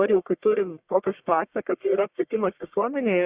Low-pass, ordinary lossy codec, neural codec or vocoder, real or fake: 3.6 kHz; Opus, 64 kbps; codec, 44.1 kHz, 1.7 kbps, Pupu-Codec; fake